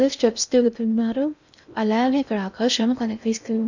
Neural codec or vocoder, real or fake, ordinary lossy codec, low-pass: codec, 16 kHz in and 24 kHz out, 0.6 kbps, FocalCodec, streaming, 2048 codes; fake; none; 7.2 kHz